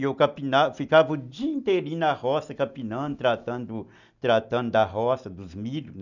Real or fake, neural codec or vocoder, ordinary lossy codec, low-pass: real; none; none; 7.2 kHz